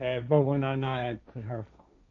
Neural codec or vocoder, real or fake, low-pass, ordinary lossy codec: codec, 16 kHz, 1.1 kbps, Voila-Tokenizer; fake; 7.2 kHz; none